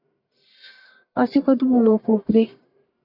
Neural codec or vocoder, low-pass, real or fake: codec, 44.1 kHz, 1.7 kbps, Pupu-Codec; 5.4 kHz; fake